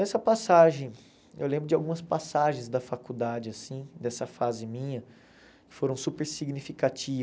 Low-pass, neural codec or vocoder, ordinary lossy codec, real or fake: none; none; none; real